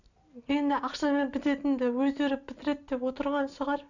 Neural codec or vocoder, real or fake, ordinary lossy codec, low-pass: none; real; none; 7.2 kHz